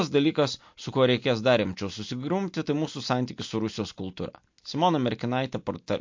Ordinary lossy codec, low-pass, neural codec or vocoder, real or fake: MP3, 48 kbps; 7.2 kHz; none; real